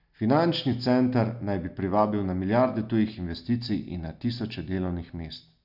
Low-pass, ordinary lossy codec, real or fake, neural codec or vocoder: 5.4 kHz; none; real; none